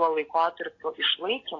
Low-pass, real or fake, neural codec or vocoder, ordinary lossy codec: 7.2 kHz; real; none; AAC, 32 kbps